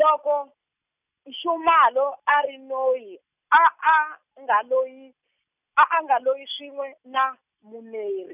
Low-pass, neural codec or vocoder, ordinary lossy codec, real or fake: 3.6 kHz; none; none; real